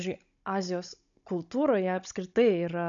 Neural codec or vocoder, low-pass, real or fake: codec, 16 kHz, 16 kbps, FunCodec, trained on Chinese and English, 50 frames a second; 7.2 kHz; fake